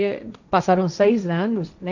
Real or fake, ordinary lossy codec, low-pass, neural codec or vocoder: fake; none; 7.2 kHz; codec, 16 kHz, 1.1 kbps, Voila-Tokenizer